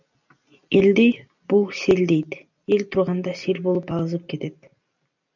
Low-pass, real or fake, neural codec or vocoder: 7.2 kHz; real; none